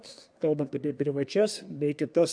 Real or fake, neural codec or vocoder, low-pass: fake; codec, 24 kHz, 1 kbps, SNAC; 9.9 kHz